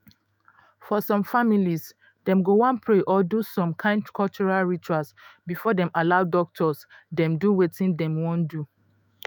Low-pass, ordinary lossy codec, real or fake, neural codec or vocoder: none; none; fake; autoencoder, 48 kHz, 128 numbers a frame, DAC-VAE, trained on Japanese speech